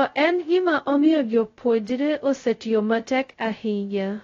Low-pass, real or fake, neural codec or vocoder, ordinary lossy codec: 7.2 kHz; fake; codec, 16 kHz, 0.2 kbps, FocalCodec; AAC, 32 kbps